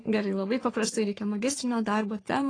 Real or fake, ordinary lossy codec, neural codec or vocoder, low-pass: fake; AAC, 32 kbps; codec, 44.1 kHz, 3.4 kbps, Pupu-Codec; 9.9 kHz